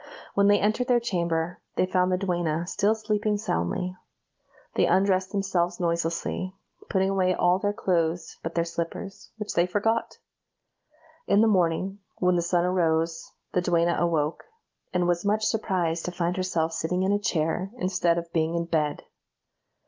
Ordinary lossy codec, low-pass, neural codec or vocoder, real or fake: Opus, 32 kbps; 7.2 kHz; none; real